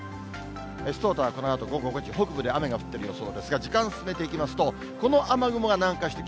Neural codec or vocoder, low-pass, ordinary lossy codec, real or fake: none; none; none; real